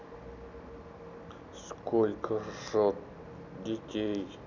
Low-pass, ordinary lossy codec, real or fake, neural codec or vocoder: 7.2 kHz; none; real; none